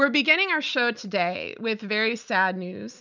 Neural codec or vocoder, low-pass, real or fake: none; 7.2 kHz; real